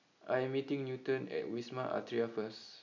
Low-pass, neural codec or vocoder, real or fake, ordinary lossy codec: 7.2 kHz; none; real; none